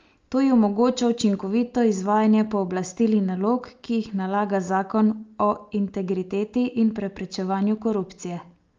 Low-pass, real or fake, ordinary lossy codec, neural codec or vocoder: 7.2 kHz; real; Opus, 32 kbps; none